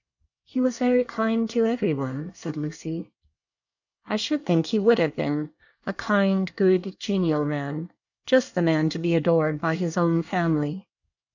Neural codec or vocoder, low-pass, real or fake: codec, 24 kHz, 1 kbps, SNAC; 7.2 kHz; fake